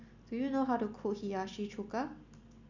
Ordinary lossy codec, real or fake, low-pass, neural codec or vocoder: none; real; 7.2 kHz; none